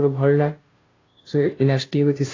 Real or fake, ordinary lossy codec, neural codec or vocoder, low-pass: fake; AAC, 32 kbps; codec, 16 kHz, 0.5 kbps, FunCodec, trained on Chinese and English, 25 frames a second; 7.2 kHz